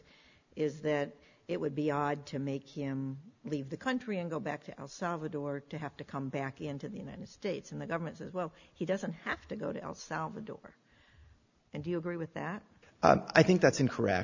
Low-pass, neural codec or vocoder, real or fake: 7.2 kHz; none; real